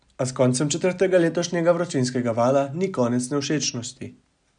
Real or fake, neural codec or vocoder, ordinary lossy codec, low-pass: real; none; none; 9.9 kHz